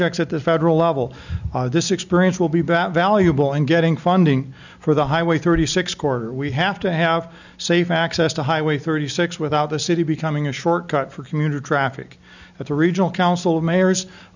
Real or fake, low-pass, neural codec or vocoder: real; 7.2 kHz; none